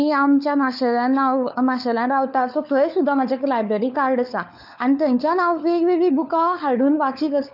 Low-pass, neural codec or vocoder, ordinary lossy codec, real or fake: 5.4 kHz; codec, 16 kHz, 4 kbps, FunCodec, trained on LibriTTS, 50 frames a second; none; fake